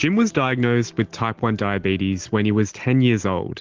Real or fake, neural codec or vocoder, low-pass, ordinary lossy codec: real; none; 7.2 kHz; Opus, 16 kbps